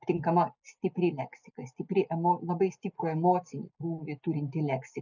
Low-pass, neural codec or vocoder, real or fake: 7.2 kHz; none; real